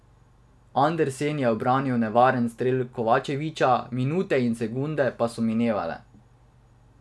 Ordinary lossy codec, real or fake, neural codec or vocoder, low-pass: none; real; none; none